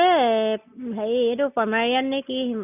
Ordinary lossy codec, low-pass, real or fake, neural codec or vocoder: none; 3.6 kHz; real; none